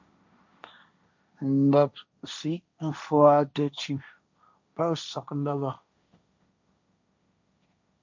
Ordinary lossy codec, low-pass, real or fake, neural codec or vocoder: MP3, 64 kbps; 7.2 kHz; fake; codec, 16 kHz, 1.1 kbps, Voila-Tokenizer